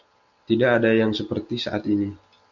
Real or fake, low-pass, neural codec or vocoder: real; 7.2 kHz; none